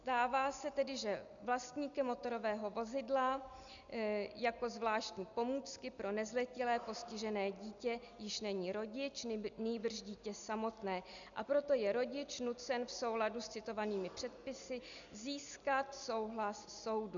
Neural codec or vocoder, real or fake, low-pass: none; real; 7.2 kHz